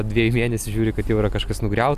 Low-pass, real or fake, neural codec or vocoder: 14.4 kHz; real; none